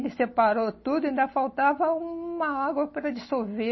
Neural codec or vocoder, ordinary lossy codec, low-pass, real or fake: none; MP3, 24 kbps; 7.2 kHz; real